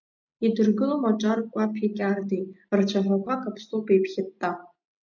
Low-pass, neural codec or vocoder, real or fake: 7.2 kHz; none; real